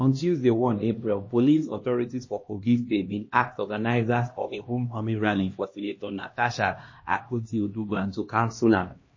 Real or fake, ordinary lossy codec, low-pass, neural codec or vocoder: fake; MP3, 32 kbps; 7.2 kHz; codec, 16 kHz, 1 kbps, X-Codec, HuBERT features, trained on LibriSpeech